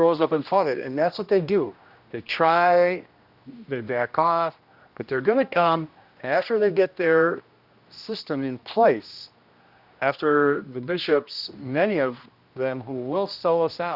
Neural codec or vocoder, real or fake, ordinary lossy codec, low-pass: codec, 16 kHz, 1 kbps, X-Codec, HuBERT features, trained on general audio; fake; Opus, 64 kbps; 5.4 kHz